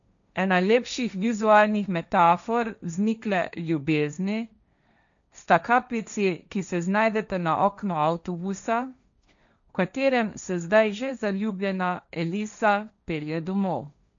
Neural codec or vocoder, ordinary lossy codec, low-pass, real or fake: codec, 16 kHz, 1.1 kbps, Voila-Tokenizer; none; 7.2 kHz; fake